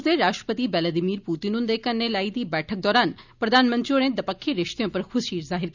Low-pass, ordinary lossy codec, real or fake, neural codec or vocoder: 7.2 kHz; none; real; none